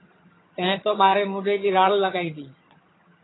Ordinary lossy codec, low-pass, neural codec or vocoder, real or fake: AAC, 16 kbps; 7.2 kHz; vocoder, 22.05 kHz, 80 mel bands, HiFi-GAN; fake